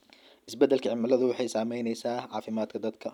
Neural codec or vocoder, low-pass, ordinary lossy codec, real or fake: none; 19.8 kHz; none; real